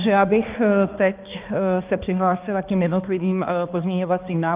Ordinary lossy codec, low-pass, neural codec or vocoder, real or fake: Opus, 32 kbps; 3.6 kHz; codec, 16 kHz, 2 kbps, X-Codec, HuBERT features, trained on balanced general audio; fake